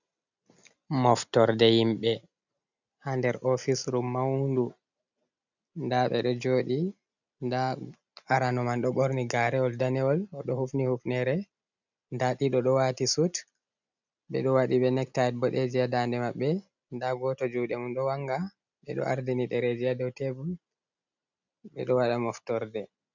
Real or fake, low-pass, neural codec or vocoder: real; 7.2 kHz; none